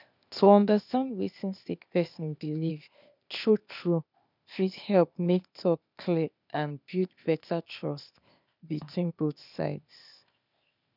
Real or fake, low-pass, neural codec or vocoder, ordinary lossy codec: fake; 5.4 kHz; codec, 16 kHz, 0.8 kbps, ZipCodec; none